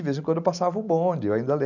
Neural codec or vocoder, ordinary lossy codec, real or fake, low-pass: none; none; real; 7.2 kHz